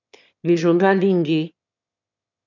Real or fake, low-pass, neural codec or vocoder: fake; 7.2 kHz; autoencoder, 22.05 kHz, a latent of 192 numbers a frame, VITS, trained on one speaker